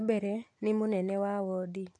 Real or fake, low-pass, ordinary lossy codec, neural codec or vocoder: real; 9.9 kHz; AAC, 48 kbps; none